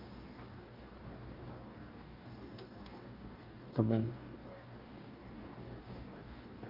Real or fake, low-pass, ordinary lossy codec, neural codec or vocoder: fake; 5.4 kHz; Opus, 32 kbps; codec, 44.1 kHz, 2.6 kbps, DAC